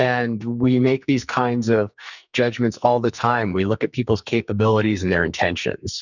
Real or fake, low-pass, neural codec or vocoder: fake; 7.2 kHz; codec, 44.1 kHz, 2.6 kbps, SNAC